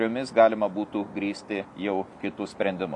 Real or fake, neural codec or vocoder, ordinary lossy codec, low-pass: real; none; AAC, 64 kbps; 10.8 kHz